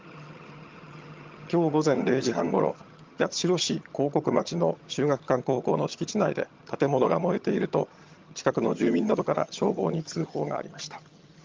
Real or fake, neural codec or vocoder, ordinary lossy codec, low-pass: fake; vocoder, 22.05 kHz, 80 mel bands, HiFi-GAN; Opus, 24 kbps; 7.2 kHz